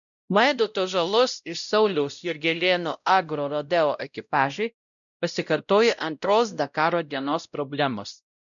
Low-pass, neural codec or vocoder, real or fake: 7.2 kHz; codec, 16 kHz, 0.5 kbps, X-Codec, WavLM features, trained on Multilingual LibriSpeech; fake